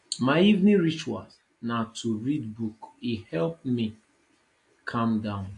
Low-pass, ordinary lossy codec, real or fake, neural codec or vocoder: 10.8 kHz; AAC, 48 kbps; real; none